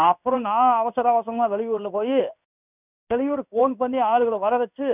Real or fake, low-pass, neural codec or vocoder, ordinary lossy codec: fake; 3.6 kHz; codec, 16 kHz in and 24 kHz out, 1 kbps, XY-Tokenizer; none